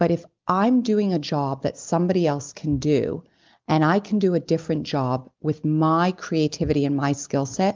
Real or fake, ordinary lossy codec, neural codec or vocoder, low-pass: real; Opus, 24 kbps; none; 7.2 kHz